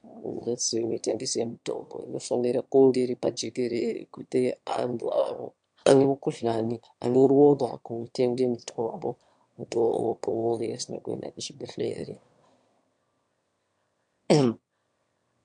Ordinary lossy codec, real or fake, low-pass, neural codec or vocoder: MP3, 64 kbps; fake; 9.9 kHz; autoencoder, 22.05 kHz, a latent of 192 numbers a frame, VITS, trained on one speaker